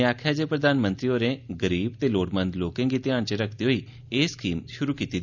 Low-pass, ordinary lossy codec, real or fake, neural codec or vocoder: 7.2 kHz; none; real; none